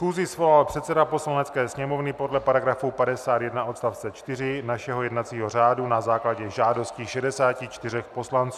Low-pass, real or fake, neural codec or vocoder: 14.4 kHz; real; none